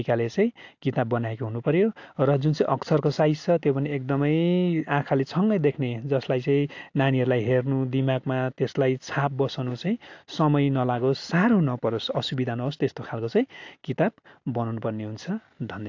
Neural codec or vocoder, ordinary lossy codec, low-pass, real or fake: none; AAC, 48 kbps; 7.2 kHz; real